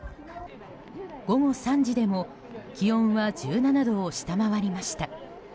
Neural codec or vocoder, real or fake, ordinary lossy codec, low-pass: none; real; none; none